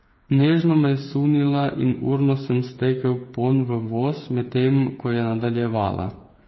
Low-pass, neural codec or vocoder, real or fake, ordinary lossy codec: 7.2 kHz; codec, 16 kHz, 8 kbps, FreqCodec, smaller model; fake; MP3, 24 kbps